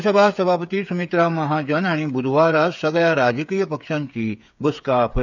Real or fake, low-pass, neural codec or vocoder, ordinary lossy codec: fake; 7.2 kHz; codec, 16 kHz, 8 kbps, FreqCodec, smaller model; none